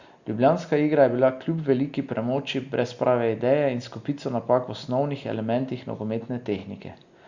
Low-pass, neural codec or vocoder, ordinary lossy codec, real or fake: 7.2 kHz; none; Opus, 64 kbps; real